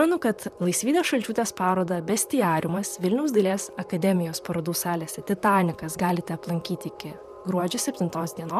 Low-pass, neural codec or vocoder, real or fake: 14.4 kHz; vocoder, 44.1 kHz, 128 mel bands, Pupu-Vocoder; fake